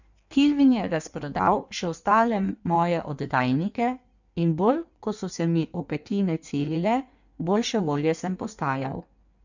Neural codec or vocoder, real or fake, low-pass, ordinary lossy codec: codec, 16 kHz in and 24 kHz out, 1.1 kbps, FireRedTTS-2 codec; fake; 7.2 kHz; none